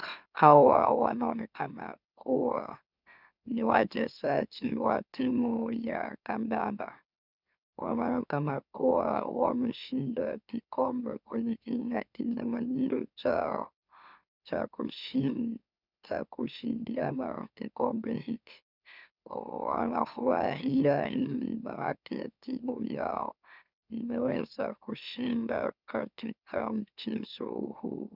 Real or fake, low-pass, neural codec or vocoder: fake; 5.4 kHz; autoencoder, 44.1 kHz, a latent of 192 numbers a frame, MeloTTS